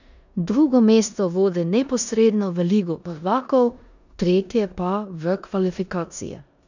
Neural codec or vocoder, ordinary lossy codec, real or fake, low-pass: codec, 16 kHz in and 24 kHz out, 0.9 kbps, LongCat-Audio-Codec, four codebook decoder; none; fake; 7.2 kHz